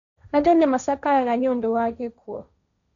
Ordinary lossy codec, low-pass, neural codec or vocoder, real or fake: none; 7.2 kHz; codec, 16 kHz, 1.1 kbps, Voila-Tokenizer; fake